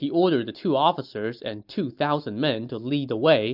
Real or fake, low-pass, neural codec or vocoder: real; 5.4 kHz; none